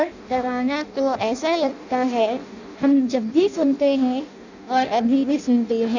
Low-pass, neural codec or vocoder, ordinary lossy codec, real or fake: 7.2 kHz; codec, 16 kHz in and 24 kHz out, 0.6 kbps, FireRedTTS-2 codec; none; fake